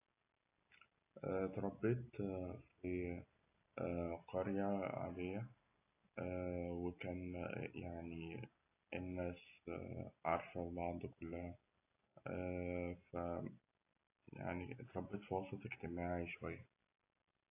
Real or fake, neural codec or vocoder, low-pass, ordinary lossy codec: real; none; 3.6 kHz; AAC, 24 kbps